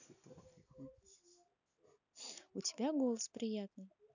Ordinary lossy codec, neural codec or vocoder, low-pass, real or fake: none; none; 7.2 kHz; real